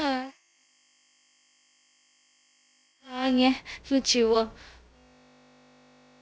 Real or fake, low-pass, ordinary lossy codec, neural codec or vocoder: fake; none; none; codec, 16 kHz, about 1 kbps, DyCAST, with the encoder's durations